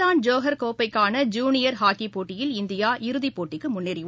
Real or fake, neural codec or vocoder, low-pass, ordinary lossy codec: real; none; none; none